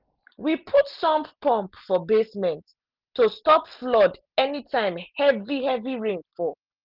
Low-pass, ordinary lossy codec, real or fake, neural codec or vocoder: 5.4 kHz; Opus, 24 kbps; real; none